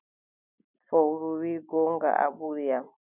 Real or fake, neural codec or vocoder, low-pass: real; none; 3.6 kHz